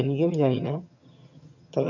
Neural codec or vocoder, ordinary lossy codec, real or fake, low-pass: vocoder, 22.05 kHz, 80 mel bands, HiFi-GAN; none; fake; 7.2 kHz